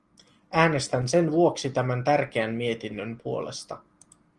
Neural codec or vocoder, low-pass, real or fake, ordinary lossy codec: none; 10.8 kHz; real; Opus, 24 kbps